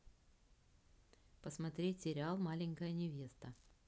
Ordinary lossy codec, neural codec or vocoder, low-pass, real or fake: none; none; none; real